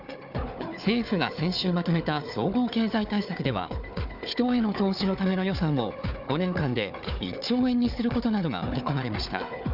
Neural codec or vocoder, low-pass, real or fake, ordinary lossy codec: codec, 16 kHz, 4 kbps, FunCodec, trained on Chinese and English, 50 frames a second; 5.4 kHz; fake; none